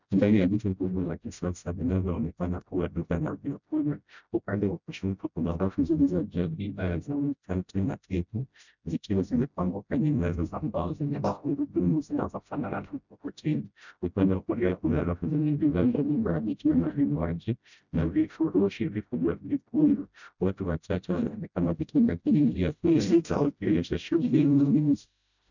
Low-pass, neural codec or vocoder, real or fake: 7.2 kHz; codec, 16 kHz, 0.5 kbps, FreqCodec, smaller model; fake